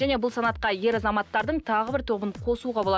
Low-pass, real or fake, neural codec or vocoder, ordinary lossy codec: none; real; none; none